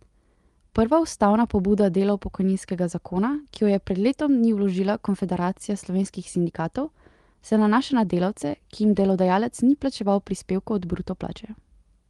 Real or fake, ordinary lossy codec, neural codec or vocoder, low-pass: real; Opus, 24 kbps; none; 10.8 kHz